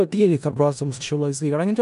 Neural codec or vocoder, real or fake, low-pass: codec, 16 kHz in and 24 kHz out, 0.4 kbps, LongCat-Audio-Codec, four codebook decoder; fake; 10.8 kHz